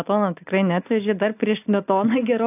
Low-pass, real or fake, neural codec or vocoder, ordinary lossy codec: 3.6 kHz; real; none; AAC, 32 kbps